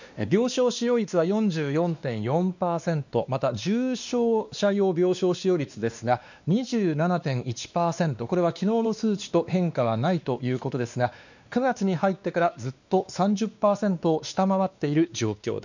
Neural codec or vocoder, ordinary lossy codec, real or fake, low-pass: codec, 16 kHz, 2 kbps, X-Codec, WavLM features, trained on Multilingual LibriSpeech; none; fake; 7.2 kHz